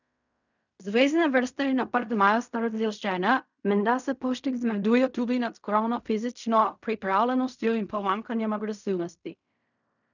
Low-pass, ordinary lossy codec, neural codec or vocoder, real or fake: 7.2 kHz; none; codec, 16 kHz in and 24 kHz out, 0.4 kbps, LongCat-Audio-Codec, fine tuned four codebook decoder; fake